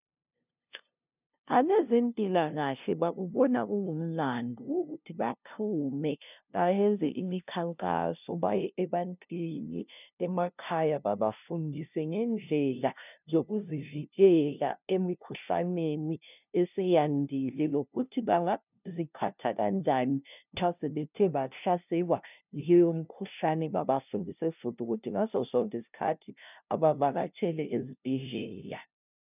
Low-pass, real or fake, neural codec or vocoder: 3.6 kHz; fake; codec, 16 kHz, 0.5 kbps, FunCodec, trained on LibriTTS, 25 frames a second